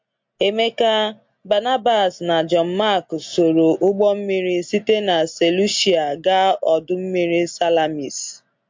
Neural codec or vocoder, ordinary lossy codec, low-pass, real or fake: none; MP3, 48 kbps; 7.2 kHz; real